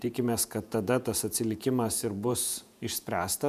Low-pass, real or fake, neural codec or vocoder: 14.4 kHz; fake; vocoder, 48 kHz, 128 mel bands, Vocos